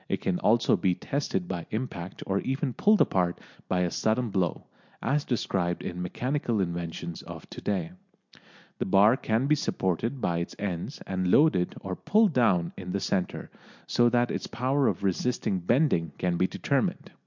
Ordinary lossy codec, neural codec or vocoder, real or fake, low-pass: MP3, 64 kbps; none; real; 7.2 kHz